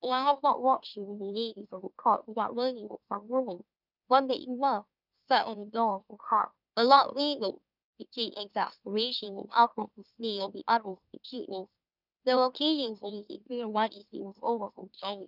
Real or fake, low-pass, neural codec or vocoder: fake; 5.4 kHz; autoencoder, 44.1 kHz, a latent of 192 numbers a frame, MeloTTS